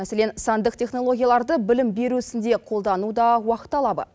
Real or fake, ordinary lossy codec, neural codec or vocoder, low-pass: real; none; none; none